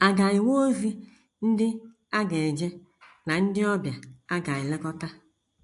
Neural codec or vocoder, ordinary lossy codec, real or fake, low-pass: none; AAC, 64 kbps; real; 10.8 kHz